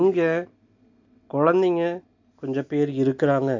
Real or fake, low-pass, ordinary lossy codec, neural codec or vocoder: real; 7.2 kHz; AAC, 48 kbps; none